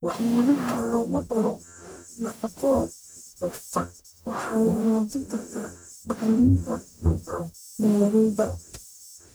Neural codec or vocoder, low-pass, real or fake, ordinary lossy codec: codec, 44.1 kHz, 0.9 kbps, DAC; none; fake; none